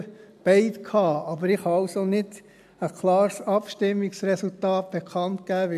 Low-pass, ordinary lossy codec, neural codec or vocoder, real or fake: 14.4 kHz; AAC, 96 kbps; none; real